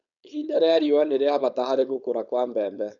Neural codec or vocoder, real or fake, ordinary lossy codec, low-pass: codec, 16 kHz, 4.8 kbps, FACodec; fake; none; 7.2 kHz